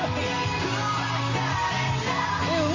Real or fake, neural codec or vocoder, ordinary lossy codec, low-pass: real; none; Opus, 32 kbps; 7.2 kHz